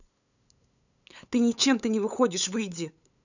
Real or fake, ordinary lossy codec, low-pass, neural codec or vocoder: fake; none; 7.2 kHz; codec, 16 kHz, 8 kbps, FunCodec, trained on LibriTTS, 25 frames a second